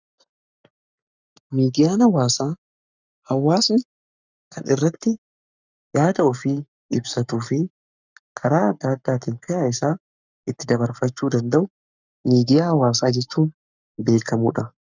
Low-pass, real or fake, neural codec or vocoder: 7.2 kHz; fake; codec, 44.1 kHz, 7.8 kbps, Pupu-Codec